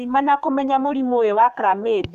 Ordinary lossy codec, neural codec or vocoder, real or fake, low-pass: none; codec, 32 kHz, 1.9 kbps, SNAC; fake; 14.4 kHz